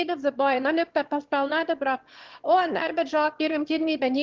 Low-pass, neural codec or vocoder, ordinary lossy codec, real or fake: 7.2 kHz; autoencoder, 22.05 kHz, a latent of 192 numbers a frame, VITS, trained on one speaker; Opus, 16 kbps; fake